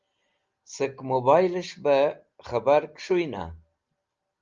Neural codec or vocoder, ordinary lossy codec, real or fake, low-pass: none; Opus, 32 kbps; real; 7.2 kHz